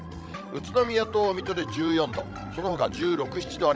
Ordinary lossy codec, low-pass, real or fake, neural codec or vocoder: none; none; fake; codec, 16 kHz, 16 kbps, FreqCodec, larger model